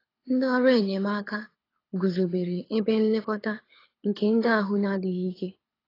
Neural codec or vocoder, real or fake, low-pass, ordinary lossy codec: codec, 24 kHz, 6 kbps, HILCodec; fake; 5.4 kHz; AAC, 24 kbps